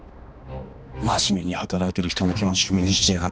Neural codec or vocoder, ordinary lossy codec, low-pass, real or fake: codec, 16 kHz, 2 kbps, X-Codec, HuBERT features, trained on balanced general audio; none; none; fake